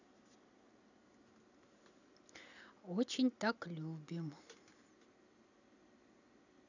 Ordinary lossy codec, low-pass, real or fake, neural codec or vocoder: none; 7.2 kHz; real; none